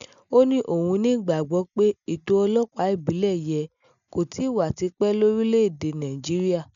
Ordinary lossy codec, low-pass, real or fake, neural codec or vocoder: none; 7.2 kHz; real; none